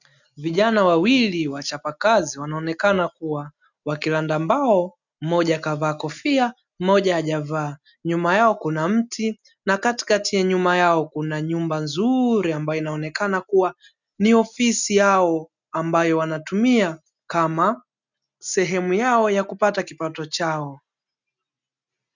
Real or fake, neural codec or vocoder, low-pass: real; none; 7.2 kHz